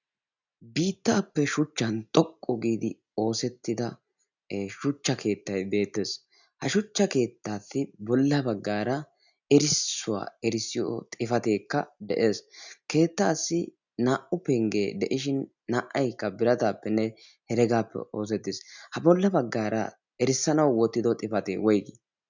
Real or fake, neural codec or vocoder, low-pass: real; none; 7.2 kHz